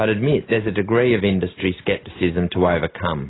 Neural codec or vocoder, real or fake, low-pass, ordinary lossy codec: none; real; 7.2 kHz; AAC, 16 kbps